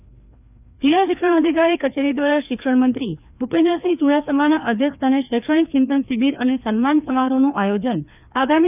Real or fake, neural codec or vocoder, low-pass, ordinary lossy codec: fake; codec, 16 kHz, 2 kbps, FreqCodec, larger model; 3.6 kHz; none